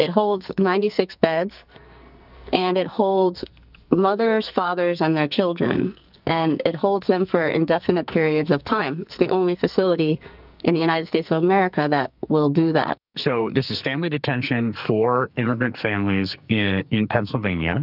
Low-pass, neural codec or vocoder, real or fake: 5.4 kHz; codec, 44.1 kHz, 2.6 kbps, SNAC; fake